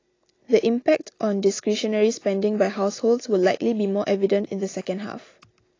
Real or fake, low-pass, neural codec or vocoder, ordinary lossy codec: real; 7.2 kHz; none; AAC, 32 kbps